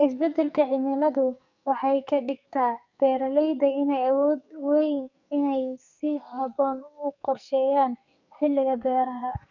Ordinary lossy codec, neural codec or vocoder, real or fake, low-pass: none; codec, 44.1 kHz, 2.6 kbps, SNAC; fake; 7.2 kHz